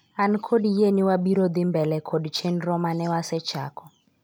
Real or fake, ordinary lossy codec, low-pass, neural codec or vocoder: real; none; none; none